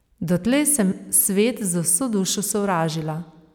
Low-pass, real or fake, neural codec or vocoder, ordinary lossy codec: none; real; none; none